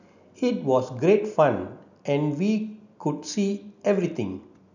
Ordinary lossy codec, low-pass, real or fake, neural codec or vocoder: none; 7.2 kHz; real; none